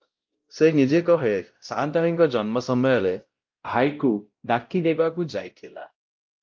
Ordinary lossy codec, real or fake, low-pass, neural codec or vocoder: Opus, 24 kbps; fake; 7.2 kHz; codec, 16 kHz, 0.5 kbps, X-Codec, WavLM features, trained on Multilingual LibriSpeech